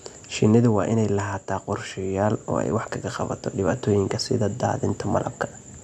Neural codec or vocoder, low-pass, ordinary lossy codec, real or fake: none; none; none; real